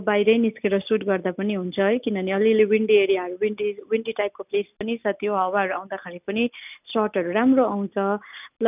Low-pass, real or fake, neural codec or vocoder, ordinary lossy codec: 3.6 kHz; real; none; none